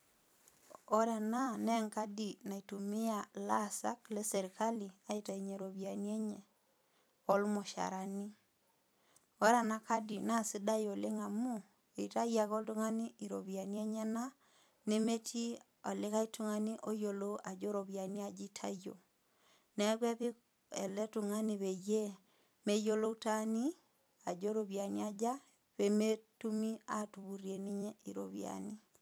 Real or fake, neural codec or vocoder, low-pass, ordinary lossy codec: fake; vocoder, 44.1 kHz, 128 mel bands every 256 samples, BigVGAN v2; none; none